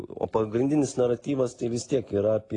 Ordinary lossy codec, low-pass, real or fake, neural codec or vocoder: AAC, 32 kbps; 10.8 kHz; real; none